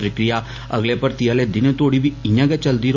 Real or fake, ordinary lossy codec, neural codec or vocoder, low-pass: real; AAC, 48 kbps; none; 7.2 kHz